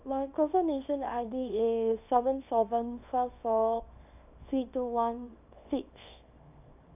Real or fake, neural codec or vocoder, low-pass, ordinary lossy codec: fake; codec, 24 kHz, 0.9 kbps, WavTokenizer, small release; 3.6 kHz; none